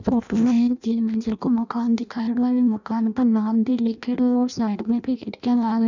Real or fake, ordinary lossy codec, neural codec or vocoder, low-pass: fake; none; codec, 16 kHz in and 24 kHz out, 0.6 kbps, FireRedTTS-2 codec; 7.2 kHz